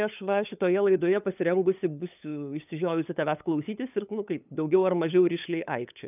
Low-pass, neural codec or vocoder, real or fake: 3.6 kHz; codec, 16 kHz, 8 kbps, FunCodec, trained on LibriTTS, 25 frames a second; fake